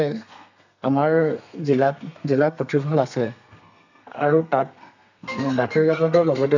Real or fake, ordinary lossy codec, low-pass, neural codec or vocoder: fake; none; 7.2 kHz; codec, 32 kHz, 1.9 kbps, SNAC